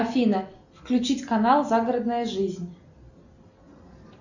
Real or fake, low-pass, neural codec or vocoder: real; 7.2 kHz; none